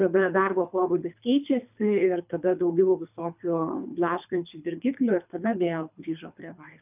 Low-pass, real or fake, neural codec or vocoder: 3.6 kHz; fake; codec, 24 kHz, 3 kbps, HILCodec